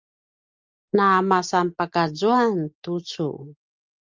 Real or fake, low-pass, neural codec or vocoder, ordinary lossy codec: real; 7.2 kHz; none; Opus, 32 kbps